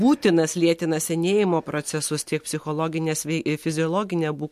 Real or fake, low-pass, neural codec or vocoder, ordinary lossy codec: real; 14.4 kHz; none; MP3, 64 kbps